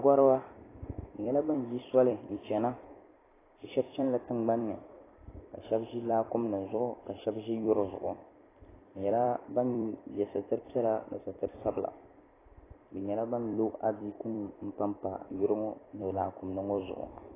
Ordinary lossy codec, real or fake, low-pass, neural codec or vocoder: AAC, 16 kbps; fake; 3.6 kHz; vocoder, 44.1 kHz, 128 mel bands every 256 samples, BigVGAN v2